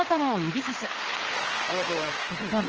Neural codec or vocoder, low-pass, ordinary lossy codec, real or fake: codec, 16 kHz, 4 kbps, FreqCodec, larger model; 7.2 kHz; Opus, 16 kbps; fake